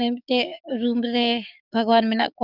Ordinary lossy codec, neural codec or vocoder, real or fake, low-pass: none; codec, 44.1 kHz, 7.8 kbps, DAC; fake; 5.4 kHz